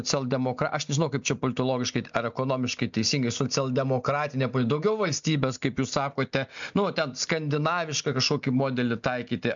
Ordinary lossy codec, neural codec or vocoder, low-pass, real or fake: MP3, 64 kbps; none; 7.2 kHz; real